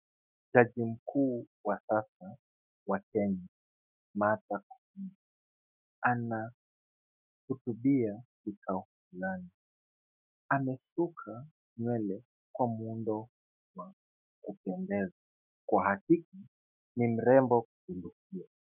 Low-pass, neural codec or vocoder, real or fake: 3.6 kHz; autoencoder, 48 kHz, 128 numbers a frame, DAC-VAE, trained on Japanese speech; fake